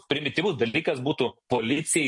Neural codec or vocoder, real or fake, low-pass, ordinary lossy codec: none; real; 10.8 kHz; MP3, 48 kbps